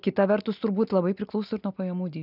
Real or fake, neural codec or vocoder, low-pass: real; none; 5.4 kHz